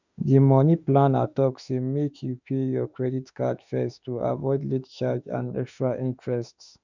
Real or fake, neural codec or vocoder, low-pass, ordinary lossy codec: fake; autoencoder, 48 kHz, 32 numbers a frame, DAC-VAE, trained on Japanese speech; 7.2 kHz; none